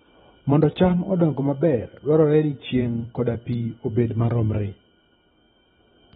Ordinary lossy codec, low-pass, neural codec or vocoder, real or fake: AAC, 16 kbps; 19.8 kHz; vocoder, 44.1 kHz, 128 mel bands every 512 samples, BigVGAN v2; fake